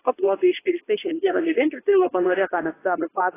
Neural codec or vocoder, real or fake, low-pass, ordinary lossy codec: codec, 24 kHz, 0.9 kbps, WavTokenizer, medium speech release version 2; fake; 3.6 kHz; AAC, 16 kbps